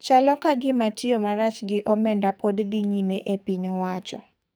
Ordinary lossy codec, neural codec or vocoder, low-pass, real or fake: none; codec, 44.1 kHz, 2.6 kbps, SNAC; none; fake